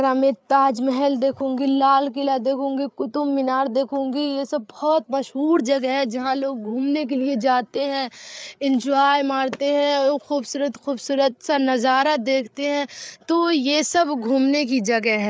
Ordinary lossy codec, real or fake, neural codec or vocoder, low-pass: none; fake; codec, 16 kHz, 8 kbps, FreqCodec, larger model; none